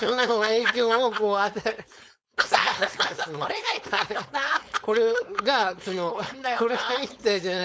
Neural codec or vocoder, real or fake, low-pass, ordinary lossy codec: codec, 16 kHz, 4.8 kbps, FACodec; fake; none; none